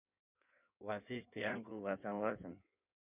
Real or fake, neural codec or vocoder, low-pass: fake; codec, 16 kHz in and 24 kHz out, 1.1 kbps, FireRedTTS-2 codec; 3.6 kHz